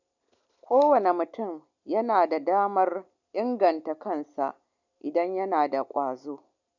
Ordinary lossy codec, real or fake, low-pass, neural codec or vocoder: none; real; 7.2 kHz; none